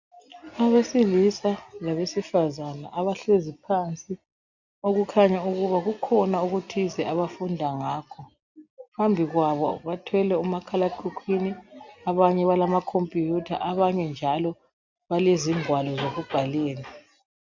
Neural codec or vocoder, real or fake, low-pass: none; real; 7.2 kHz